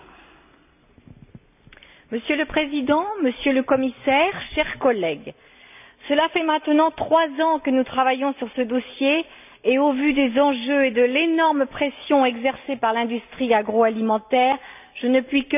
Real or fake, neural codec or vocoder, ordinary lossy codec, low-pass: real; none; none; 3.6 kHz